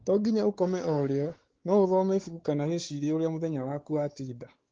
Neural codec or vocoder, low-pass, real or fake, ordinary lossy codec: codec, 16 kHz, 4 kbps, X-Codec, WavLM features, trained on Multilingual LibriSpeech; 7.2 kHz; fake; Opus, 16 kbps